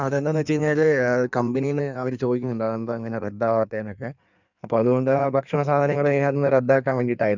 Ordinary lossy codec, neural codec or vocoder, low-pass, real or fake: none; codec, 16 kHz in and 24 kHz out, 1.1 kbps, FireRedTTS-2 codec; 7.2 kHz; fake